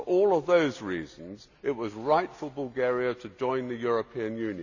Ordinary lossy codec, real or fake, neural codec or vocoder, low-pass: none; real; none; 7.2 kHz